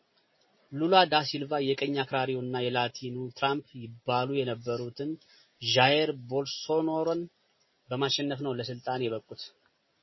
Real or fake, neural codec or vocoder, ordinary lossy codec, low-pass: real; none; MP3, 24 kbps; 7.2 kHz